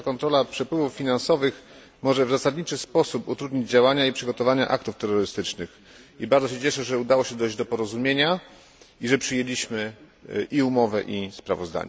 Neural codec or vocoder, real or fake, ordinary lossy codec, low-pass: none; real; none; none